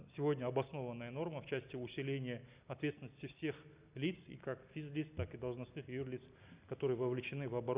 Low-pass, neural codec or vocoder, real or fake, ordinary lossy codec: 3.6 kHz; none; real; Opus, 64 kbps